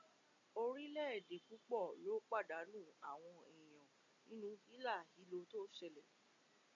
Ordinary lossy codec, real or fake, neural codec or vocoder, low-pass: AAC, 48 kbps; real; none; 7.2 kHz